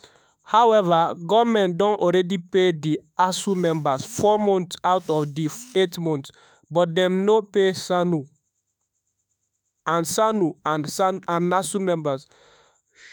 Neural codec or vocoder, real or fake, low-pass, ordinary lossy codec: autoencoder, 48 kHz, 32 numbers a frame, DAC-VAE, trained on Japanese speech; fake; none; none